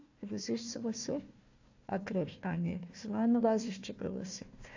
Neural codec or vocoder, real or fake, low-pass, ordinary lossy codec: codec, 16 kHz, 1 kbps, FunCodec, trained on Chinese and English, 50 frames a second; fake; 7.2 kHz; AAC, 48 kbps